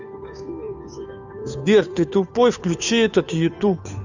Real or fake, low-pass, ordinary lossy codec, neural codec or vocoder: fake; 7.2 kHz; none; codec, 16 kHz, 2 kbps, FunCodec, trained on Chinese and English, 25 frames a second